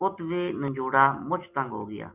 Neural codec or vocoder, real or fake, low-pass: none; real; 3.6 kHz